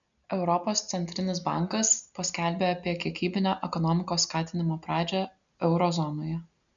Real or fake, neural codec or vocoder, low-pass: real; none; 7.2 kHz